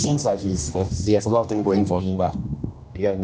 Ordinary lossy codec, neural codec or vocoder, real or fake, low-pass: none; codec, 16 kHz, 1 kbps, X-Codec, HuBERT features, trained on general audio; fake; none